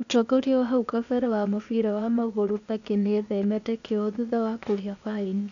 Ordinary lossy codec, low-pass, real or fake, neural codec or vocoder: none; 7.2 kHz; fake; codec, 16 kHz, 0.8 kbps, ZipCodec